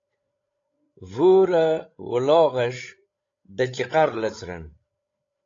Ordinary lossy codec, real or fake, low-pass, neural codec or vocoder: AAC, 32 kbps; fake; 7.2 kHz; codec, 16 kHz, 16 kbps, FreqCodec, larger model